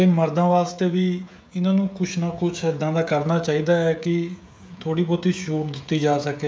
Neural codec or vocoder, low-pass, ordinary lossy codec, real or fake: codec, 16 kHz, 16 kbps, FreqCodec, smaller model; none; none; fake